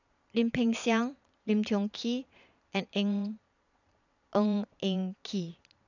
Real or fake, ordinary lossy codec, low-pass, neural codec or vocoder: fake; none; 7.2 kHz; vocoder, 22.05 kHz, 80 mel bands, Vocos